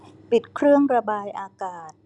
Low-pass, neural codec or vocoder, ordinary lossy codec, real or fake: 10.8 kHz; none; none; real